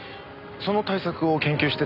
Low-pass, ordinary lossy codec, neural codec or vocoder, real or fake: 5.4 kHz; none; none; real